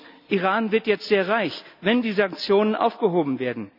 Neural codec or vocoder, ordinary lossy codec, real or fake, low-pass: none; none; real; 5.4 kHz